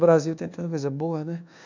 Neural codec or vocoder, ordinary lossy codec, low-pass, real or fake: codec, 24 kHz, 1.2 kbps, DualCodec; none; 7.2 kHz; fake